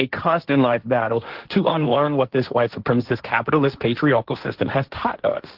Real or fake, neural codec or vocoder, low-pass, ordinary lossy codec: fake; codec, 16 kHz, 1.1 kbps, Voila-Tokenizer; 5.4 kHz; Opus, 16 kbps